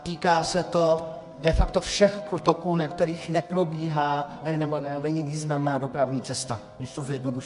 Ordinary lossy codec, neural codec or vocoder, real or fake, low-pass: MP3, 64 kbps; codec, 24 kHz, 0.9 kbps, WavTokenizer, medium music audio release; fake; 10.8 kHz